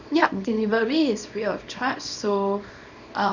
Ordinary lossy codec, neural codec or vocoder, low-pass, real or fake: none; codec, 24 kHz, 0.9 kbps, WavTokenizer, small release; 7.2 kHz; fake